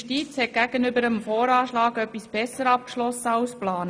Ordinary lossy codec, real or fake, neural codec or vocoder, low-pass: none; real; none; none